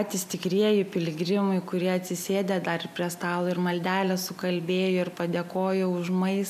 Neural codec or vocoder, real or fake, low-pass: none; real; 14.4 kHz